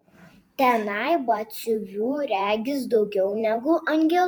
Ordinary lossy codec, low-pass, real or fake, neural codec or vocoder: MP3, 96 kbps; 19.8 kHz; fake; vocoder, 44.1 kHz, 128 mel bands every 512 samples, BigVGAN v2